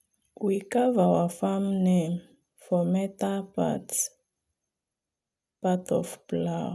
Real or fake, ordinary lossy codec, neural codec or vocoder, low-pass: real; none; none; none